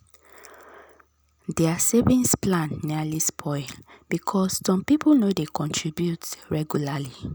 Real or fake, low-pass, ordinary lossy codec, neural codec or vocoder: real; none; none; none